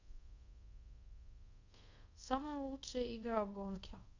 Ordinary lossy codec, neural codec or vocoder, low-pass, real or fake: none; codec, 24 kHz, 0.5 kbps, DualCodec; 7.2 kHz; fake